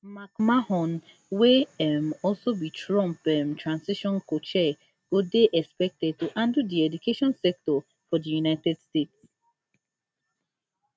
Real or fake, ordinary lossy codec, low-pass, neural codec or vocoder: real; none; none; none